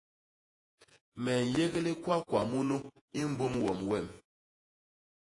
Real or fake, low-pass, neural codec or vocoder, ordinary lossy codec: fake; 10.8 kHz; vocoder, 48 kHz, 128 mel bands, Vocos; AAC, 48 kbps